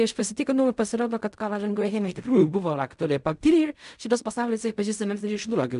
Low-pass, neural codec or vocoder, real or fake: 10.8 kHz; codec, 16 kHz in and 24 kHz out, 0.4 kbps, LongCat-Audio-Codec, fine tuned four codebook decoder; fake